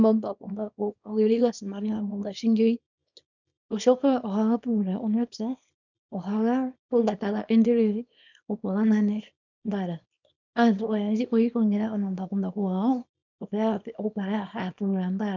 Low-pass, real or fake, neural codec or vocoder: 7.2 kHz; fake; codec, 24 kHz, 0.9 kbps, WavTokenizer, small release